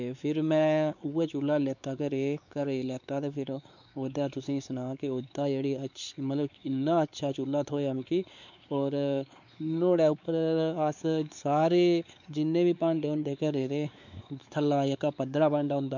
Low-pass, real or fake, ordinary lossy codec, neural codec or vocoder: 7.2 kHz; fake; none; codec, 16 kHz, 4 kbps, X-Codec, WavLM features, trained on Multilingual LibriSpeech